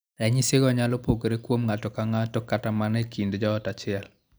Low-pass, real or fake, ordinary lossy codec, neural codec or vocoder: none; real; none; none